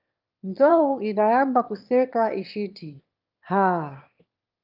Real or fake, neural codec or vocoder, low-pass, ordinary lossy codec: fake; autoencoder, 22.05 kHz, a latent of 192 numbers a frame, VITS, trained on one speaker; 5.4 kHz; Opus, 24 kbps